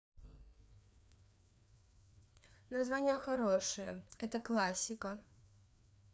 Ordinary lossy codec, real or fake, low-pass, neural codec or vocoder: none; fake; none; codec, 16 kHz, 2 kbps, FreqCodec, larger model